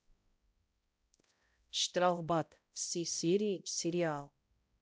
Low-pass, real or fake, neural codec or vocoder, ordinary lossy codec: none; fake; codec, 16 kHz, 0.5 kbps, X-Codec, HuBERT features, trained on balanced general audio; none